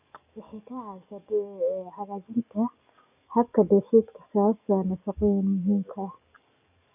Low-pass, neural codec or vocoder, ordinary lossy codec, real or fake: 3.6 kHz; codec, 44.1 kHz, 7.8 kbps, DAC; none; fake